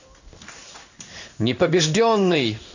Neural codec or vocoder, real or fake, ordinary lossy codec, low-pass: codec, 16 kHz in and 24 kHz out, 1 kbps, XY-Tokenizer; fake; none; 7.2 kHz